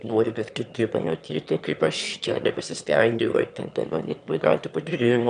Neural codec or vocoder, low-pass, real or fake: autoencoder, 22.05 kHz, a latent of 192 numbers a frame, VITS, trained on one speaker; 9.9 kHz; fake